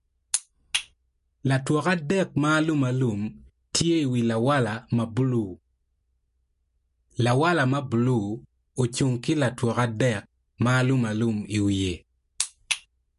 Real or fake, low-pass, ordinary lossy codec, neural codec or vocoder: real; 14.4 kHz; MP3, 48 kbps; none